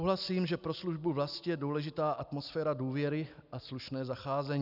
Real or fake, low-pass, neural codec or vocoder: real; 5.4 kHz; none